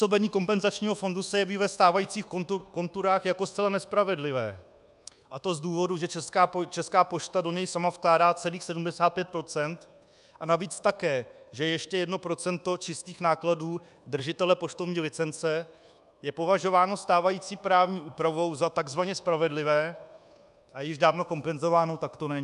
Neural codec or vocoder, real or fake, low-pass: codec, 24 kHz, 1.2 kbps, DualCodec; fake; 10.8 kHz